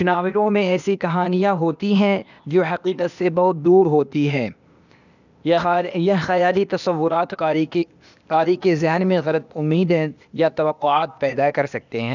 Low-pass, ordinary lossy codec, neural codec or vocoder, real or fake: 7.2 kHz; none; codec, 16 kHz, 0.8 kbps, ZipCodec; fake